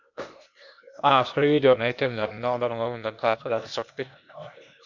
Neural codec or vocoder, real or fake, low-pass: codec, 16 kHz, 0.8 kbps, ZipCodec; fake; 7.2 kHz